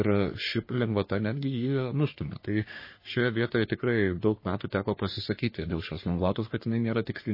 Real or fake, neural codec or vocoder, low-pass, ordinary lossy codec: fake; codec, 44.1 kHz, 3.4 kbps, Pupu-Codec; 5.4 kHz; MP3, 24 kbps